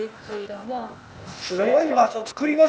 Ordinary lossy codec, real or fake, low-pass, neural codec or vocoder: none; fake; none; codec, 16 kHz, 0.8 kbps, ZipCodec